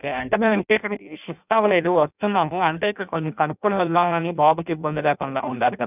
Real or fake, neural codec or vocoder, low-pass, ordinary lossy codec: fake; codec, 16 kHz in and 24 kHz out, 0.6 kbps, FireRedTTS-2 codec; 3.6 kHz; none